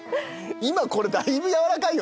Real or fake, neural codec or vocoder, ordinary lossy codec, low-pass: real; none; none; none